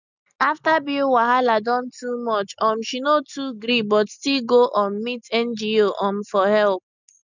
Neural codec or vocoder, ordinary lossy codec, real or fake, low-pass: none; none; real; 7.2 kHz